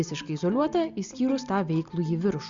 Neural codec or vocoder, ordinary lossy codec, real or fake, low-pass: none; Opus, 64 kbps; real; 7.2 kHz